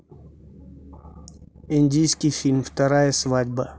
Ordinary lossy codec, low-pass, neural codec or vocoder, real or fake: none; none; none; real